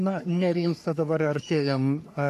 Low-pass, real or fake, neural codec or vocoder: 14.4 kHz; fake; codec, 44.1 kHz, 3.4 kbps, Pupu-Codec